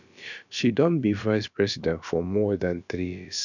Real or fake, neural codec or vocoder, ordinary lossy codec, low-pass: fake; codec, 16 kHz, about 1 kbps, DyCAST, with the encoder's durations; none; 7.2 kHz